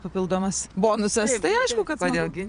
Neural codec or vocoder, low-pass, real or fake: vocoder, 22.05 kHz, 80 mel bands, Vocos; 9.9 kHz; fake